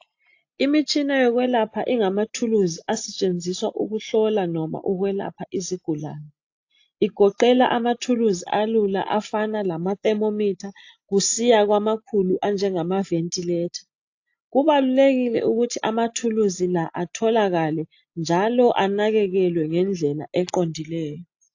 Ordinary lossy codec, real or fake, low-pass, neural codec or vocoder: AAC, 48 kbps; real; 7.2 kHz; none